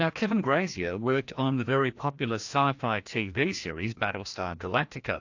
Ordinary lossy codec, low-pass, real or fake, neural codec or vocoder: AAC, 48 kbps; 7.2 kHz; fake; codec, 16 kHz, 1 kbps, FreqCodec, larger model